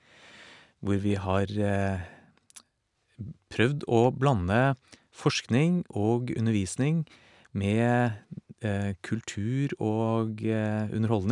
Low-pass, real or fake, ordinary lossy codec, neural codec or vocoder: 10.8 kHz; real; none; none